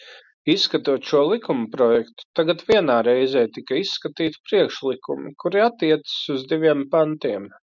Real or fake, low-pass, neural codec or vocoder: real; 7.2 kHz; none